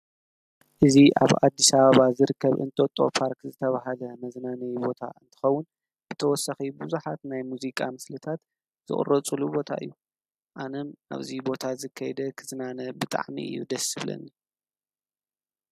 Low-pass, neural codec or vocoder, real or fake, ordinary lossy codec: 14.4 kHz; none; real; AAC, 96 kbps